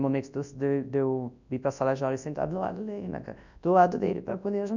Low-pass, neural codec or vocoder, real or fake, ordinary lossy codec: 7.2 kHz; codec, 24 kHz, 0.9 kbps, WavTokenizer, large speech release; fake; none